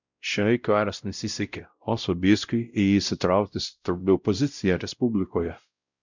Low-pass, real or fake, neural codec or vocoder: 7.2 kHz; fake; codec, 16 kHz, 0.5 kbps, X-Codec, WavLM features, trained on Multilingual LibriSpeech